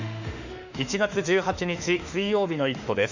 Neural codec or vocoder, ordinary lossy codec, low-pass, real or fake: autoencoder, 48 kHz, 32 numbers a frame, DAC-VAE, trained on Japanese speech; none; 7.2 kHz; fake